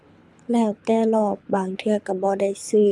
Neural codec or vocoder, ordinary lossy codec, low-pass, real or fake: codec, 24 kHz, 6 kbps, HILCodec; none; none; fake